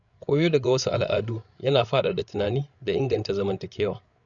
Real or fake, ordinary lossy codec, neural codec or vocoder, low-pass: fake; none; codec, 16 kHz, 8 kbps, FreqCodec, larger model; 7.2 kHz